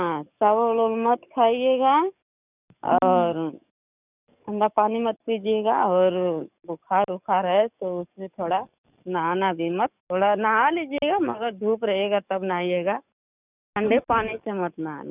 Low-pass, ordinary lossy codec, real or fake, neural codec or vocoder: 3.6 kHz; none; fake; codec, 44.1 kHz, 7.8 kbps, DAC